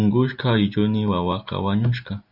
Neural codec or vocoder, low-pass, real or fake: none; 5.4 kHz; real